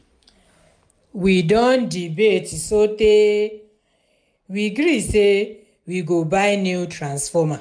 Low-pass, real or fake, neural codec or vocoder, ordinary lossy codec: 9.9 kHz; real; none; MP3, 96 kbps